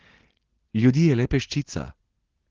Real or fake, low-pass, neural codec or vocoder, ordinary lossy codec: real; 7.2 kHz; none; Opus, 16 kbps